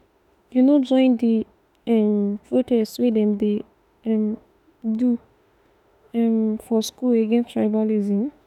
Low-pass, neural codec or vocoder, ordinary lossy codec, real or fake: 19.8 kHz; autoencoder, 48 kHz, 32 numbers a frame, DAC-VAE, trained on Japanese speech; none; fake